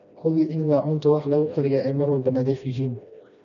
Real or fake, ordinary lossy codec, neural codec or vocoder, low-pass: fake; none; codec, 16 kHz, 1 kbps, FreqCodec, smaller model; 7.2 kHz